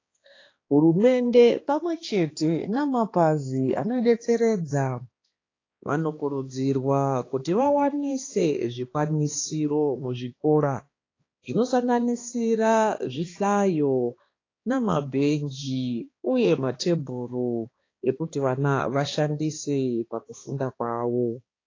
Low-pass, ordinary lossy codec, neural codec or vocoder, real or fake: 7.2 kHz; AAC, 32 kbps; codec, 16 kHz, 2 kbps, X-Codec, HuBERT features, trained on balanced general audio; fake